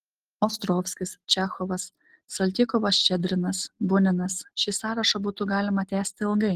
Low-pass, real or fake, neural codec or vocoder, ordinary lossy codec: 14.4 kHz; real; none; Opus, 16 kbps